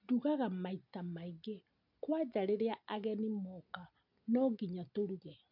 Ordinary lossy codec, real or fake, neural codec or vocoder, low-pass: none; real; none; 5.4 kHz